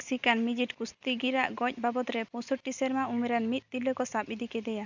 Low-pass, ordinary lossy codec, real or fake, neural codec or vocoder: 7.2 kHz; none; real; none